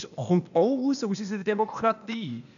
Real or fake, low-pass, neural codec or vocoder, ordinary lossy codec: fake; 7.2 kHz; codec, 16 kHz, 0.8 kbps, ZipCodec; none